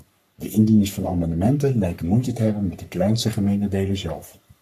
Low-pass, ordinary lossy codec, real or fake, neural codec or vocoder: 14.4 kHz; AAC, 96 kbps; fake; codec, 44.1 kHz, 3.4 kbps, Pupu-Codec